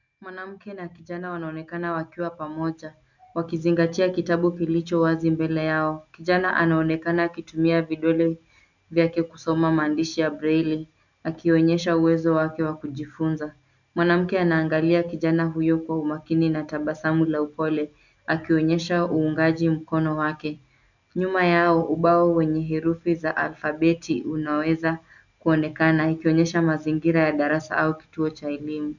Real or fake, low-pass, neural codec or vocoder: real; 7.2 kHz; none